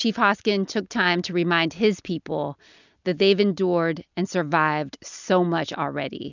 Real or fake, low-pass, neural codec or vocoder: real; 7.2 kHz; none